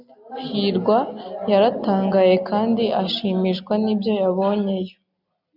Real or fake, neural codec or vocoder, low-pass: real; none; 5.4 kHz